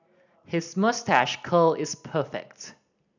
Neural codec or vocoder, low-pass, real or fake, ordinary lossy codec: none; 7.2 kHz; real; none